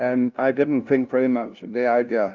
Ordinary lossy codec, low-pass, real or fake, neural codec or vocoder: Opus, 24 kbps; 7.2 kHz; fake; codec, 16 kHz, 0.5 kbps, FunCodec, trained on LibriTTS, 25 frames a second